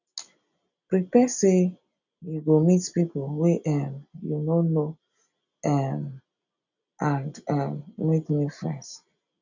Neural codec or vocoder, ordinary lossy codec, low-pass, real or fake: none; none; 7.2 kHz; real